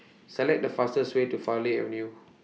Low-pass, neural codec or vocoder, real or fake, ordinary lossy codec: none; none; real; none